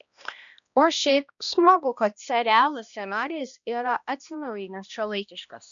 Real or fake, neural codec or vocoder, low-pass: fake; codec, 16 kHz, 1 kbps, X-Codec, HuBERT features, trained on balanced general audio; 7.2 kHz